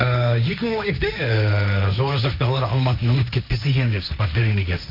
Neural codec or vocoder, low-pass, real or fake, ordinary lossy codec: codec, 16 kHz, 1.1 kbps, Voila-Tokenizer; 5.4 kHz; fake; MP3, 48 kbps